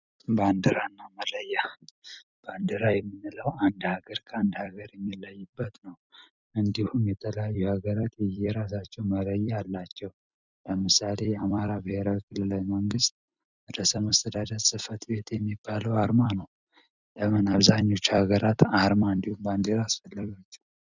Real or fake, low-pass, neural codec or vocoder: fake; 7.2 kHz; vocoder, 44.1 kHz, 128 mel bands every 256 samples, BigVGAN v2